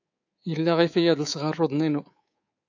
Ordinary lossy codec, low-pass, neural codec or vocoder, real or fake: AAC, 48 kbps; 7.2 kHz; codec, 24 kHz, 3.1 kbps, DualCodec; fake